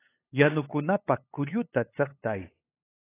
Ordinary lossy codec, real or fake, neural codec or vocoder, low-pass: AAC, 24 kbps; fake; codec, 16 kHz, 8 kbps, FunCodec, trained on LibriTTS, 25 frames a second; 3.6 kHz